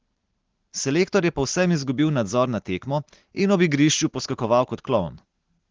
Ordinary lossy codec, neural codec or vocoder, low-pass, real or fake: Opus, 16 kbps; none; 7.2 kHz; real